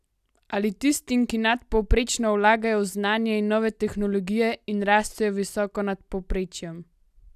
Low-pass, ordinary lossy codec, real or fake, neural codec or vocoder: 14.4 kHz; none; real; none